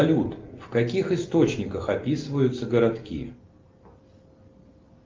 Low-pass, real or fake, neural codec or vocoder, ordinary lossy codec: 7.2 kHz; real; none; Opus, 24 kbps